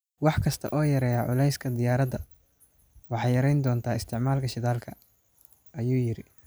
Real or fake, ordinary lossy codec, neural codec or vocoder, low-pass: real; none; none; none